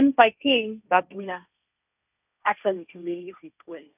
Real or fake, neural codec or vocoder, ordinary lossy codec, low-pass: fake; codec, 16 kHz, 1.1 kbps, Voila-Tokenizer; none; 3.6 kHz